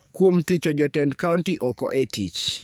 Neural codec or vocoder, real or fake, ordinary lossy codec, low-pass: codec, 44.1 kHz, 2.6 kbps, SNAC; fake; none; none